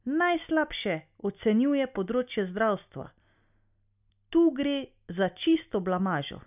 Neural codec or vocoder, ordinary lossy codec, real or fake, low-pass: none; none; real; 3.6 kHz